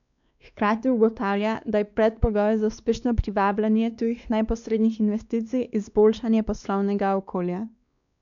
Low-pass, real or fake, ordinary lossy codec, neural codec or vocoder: 7.2 kHz; fake; none; codec, 16 kHz, 2 kbps, X-Codec, WavLM features, trained on Multilingual LibriSpeech